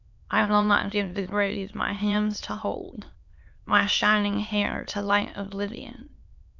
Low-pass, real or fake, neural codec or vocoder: 7.2 kHz; fake; autoencoder, 22.05 kHz, a latent of 192 numbers a frame, VITS, trained on many speakers